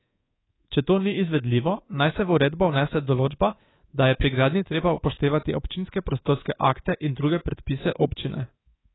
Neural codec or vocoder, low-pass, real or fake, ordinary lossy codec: codec, 24 kHz, 3.1 kbps, DualCodec; 7.2 kHz; fake; AAC, 16 kbps